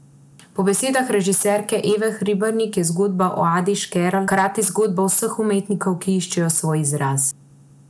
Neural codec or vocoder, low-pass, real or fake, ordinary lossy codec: none; none; real; none